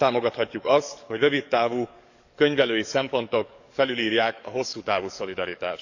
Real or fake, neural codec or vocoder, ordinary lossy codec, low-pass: fake; codec, 44.1 kHz, 7.8 kbps, DAC; none; 7.2 kHz